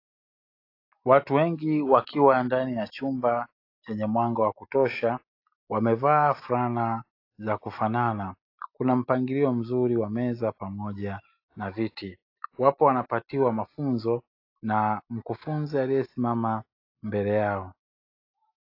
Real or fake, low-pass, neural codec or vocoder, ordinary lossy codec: real; 5.4 kHz; none; AAC, 32 kbps